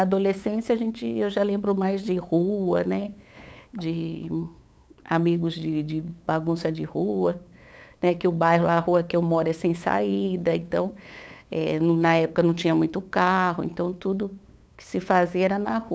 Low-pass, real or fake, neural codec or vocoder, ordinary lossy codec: none; fake; codec, 16 kHz, 8 kbps, FunCodec, trained on LibriTTS, 25 frames a second; none